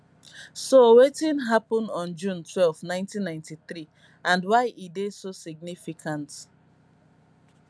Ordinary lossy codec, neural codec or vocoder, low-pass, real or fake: none; none; none; real